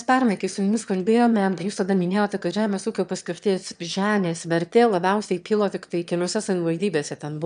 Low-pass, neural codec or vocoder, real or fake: 9.9 kHz; autoencoder, 22.05 kHz, a latent of 192 numbers a frame, VITS, trained on one speaker; fake